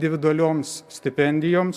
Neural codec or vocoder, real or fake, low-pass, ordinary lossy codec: none; real; 14.4 kHz; AAC, 96 kbps